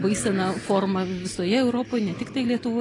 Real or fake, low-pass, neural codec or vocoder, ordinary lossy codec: real; 10.8 kHz; none; AAC, 32 kbps